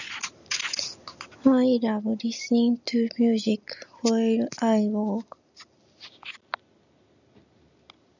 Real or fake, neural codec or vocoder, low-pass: real; none; 7.2 kHz